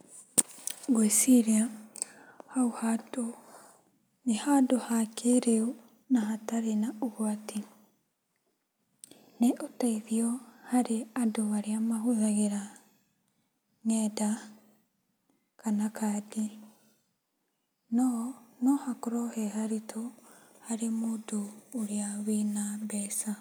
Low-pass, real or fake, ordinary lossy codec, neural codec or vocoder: none; real; none; none